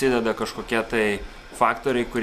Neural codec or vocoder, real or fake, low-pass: none; real; 14.4 kHz